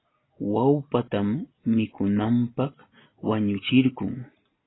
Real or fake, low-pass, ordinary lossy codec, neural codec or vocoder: real; 7.2 kHz; AAC, 16 kbps; none